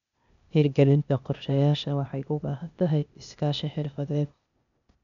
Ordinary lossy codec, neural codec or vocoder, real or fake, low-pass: none; codec, 16 kHz, 0.8 kbps, ZipCodec; fake; 7.2 kHz